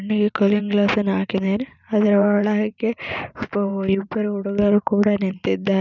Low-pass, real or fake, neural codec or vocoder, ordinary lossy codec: 7.2 kHz; fake; vocoder, 44.1 kHz, 128 mel bands every 512 samples, BigVGAN v2; none